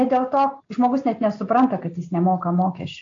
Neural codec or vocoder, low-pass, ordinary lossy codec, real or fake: none; 7.2 kHz; AAC, 48 kbps; real